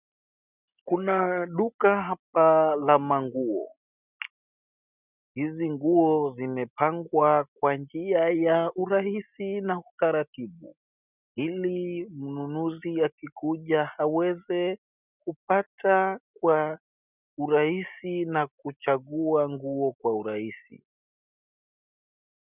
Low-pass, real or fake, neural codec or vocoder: 3.6 kHz; real; none